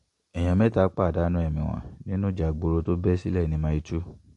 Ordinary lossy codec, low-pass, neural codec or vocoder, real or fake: MP3, 48 kbps; 14.4 kHz; none; real